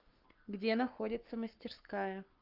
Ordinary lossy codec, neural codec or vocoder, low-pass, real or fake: Opus, 24 kbps; codec, 44.1 kHz, 7.8 kbps, Pupu-Codec; 5.4 kHz; fake